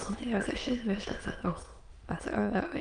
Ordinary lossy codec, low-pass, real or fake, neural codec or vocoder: Opus, 32 kbps; 9.9 kHz; fake; autoencoder, 22.05 kHz, a latent of 192 numbers a frame, VITS, trained on many speakers